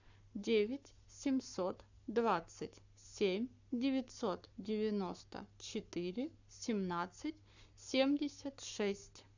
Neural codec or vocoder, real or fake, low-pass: codec, 44.1 kHz, 7.8 kbps, Pupu-Codec; fake; 7.2 kHz